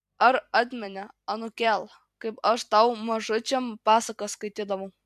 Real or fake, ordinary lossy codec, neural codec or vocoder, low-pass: fake; AAC, 96 kbps; vocoder, 44.1 kHz, 128 mel bands every 256 samples, BigVGAN v2; 14.4 kHz